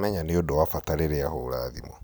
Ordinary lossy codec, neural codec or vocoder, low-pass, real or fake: none; none; none; real